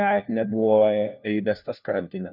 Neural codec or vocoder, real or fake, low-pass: codec, 16 kHz, 1 kbps, FunCodec, trained on LibriTTS, 50 frames a second; fake; 5.4 kHz